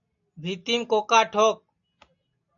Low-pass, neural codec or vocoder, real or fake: 7.2 kHz; none; real